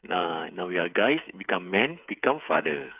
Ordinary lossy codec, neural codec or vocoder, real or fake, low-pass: none; codec, 16 kHz, 8 kbps, FreqCodec, smaller model; fake; 3.6 kHz